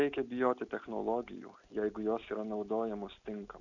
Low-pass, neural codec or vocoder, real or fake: 7.2 kHz; none; real